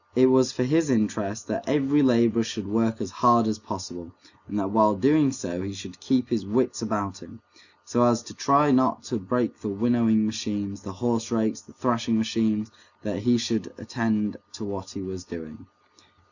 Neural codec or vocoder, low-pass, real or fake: none; 7.2 kHz; real